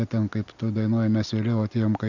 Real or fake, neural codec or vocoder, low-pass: real; none; 7.2 kHz